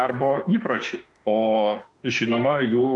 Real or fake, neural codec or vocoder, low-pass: fake; autoencoder, 48 kHz, 32 numbers a frame, DAC-VAE, trained on Japanese speech; 10.8 kHz